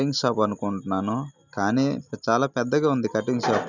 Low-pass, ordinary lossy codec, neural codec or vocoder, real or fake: 7.2 kHz; none; none; real